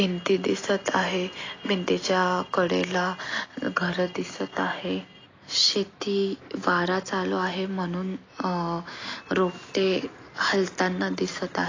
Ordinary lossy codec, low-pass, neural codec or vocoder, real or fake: AAC, 32 kbps; 7.2 kHz; none; real